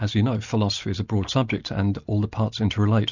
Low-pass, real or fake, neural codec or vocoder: 7.2 kHz; real; none